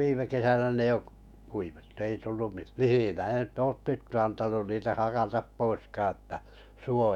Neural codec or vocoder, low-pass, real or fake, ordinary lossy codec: autoencoder, 48 kHz, 128 numbers a frame, DAC-VAE, trained on Japanese speech; 19.8 kHz; fake; none